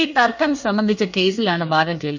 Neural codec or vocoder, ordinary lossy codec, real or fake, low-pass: codec, 24 kHz, 1 kbps, SNAC; none; fake; 7.2 kHz